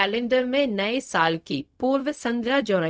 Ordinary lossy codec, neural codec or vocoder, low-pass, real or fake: none; codec, 16 kHz, 0.4 kbps, LongCat-Audio-Codec; none; fake